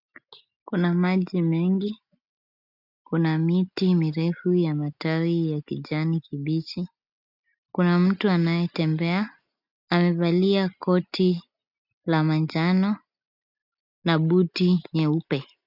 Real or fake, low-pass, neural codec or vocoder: real; 5.4 kHz; none